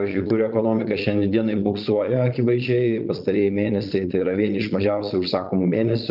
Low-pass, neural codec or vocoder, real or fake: 5.4 kHz; vocoder, 22.05 kHz, 80 mel bands, Vocos; fake